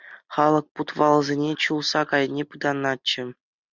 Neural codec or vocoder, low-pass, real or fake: none; 7.2 kHz; real